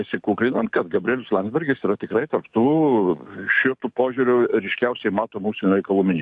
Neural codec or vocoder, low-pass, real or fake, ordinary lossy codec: codec, 44.1 kHz, 7.8 kbps, DAC; 10.8 kHz; fake; AAC, 64 kbps